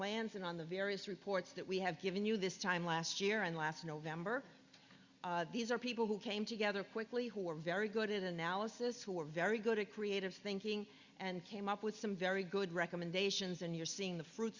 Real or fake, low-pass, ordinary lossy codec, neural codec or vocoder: real; 7.2 kHz; Opus, 64 kbps; none